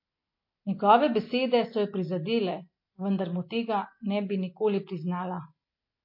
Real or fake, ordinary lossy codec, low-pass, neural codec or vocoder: real; MP3, 32 kbps; 5.4 kHz; none